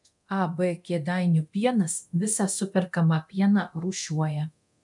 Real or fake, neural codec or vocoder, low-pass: fake; codec, 24 kHz, 0.9 kbps, DualCodec; 10.8 kHz